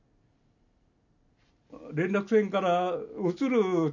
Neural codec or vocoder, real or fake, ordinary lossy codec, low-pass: none; real; none; 7.2 kHz